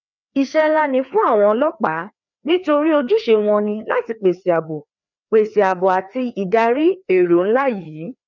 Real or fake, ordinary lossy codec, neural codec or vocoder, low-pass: fake; none; codec, 16 kHz, 2 kbps, FreqCodec, larger model; 7.2 kHz